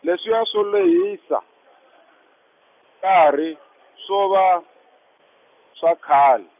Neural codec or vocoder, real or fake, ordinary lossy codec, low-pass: none; real; none; 3.6 kHz